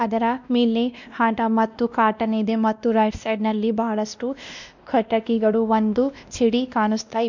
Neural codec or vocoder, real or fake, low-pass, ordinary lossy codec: codec, 16 kHz, 1 kbps, X-Codec, WavLM features, trained on Multilingual LibriSpeech; fake; 7.2 kHz; none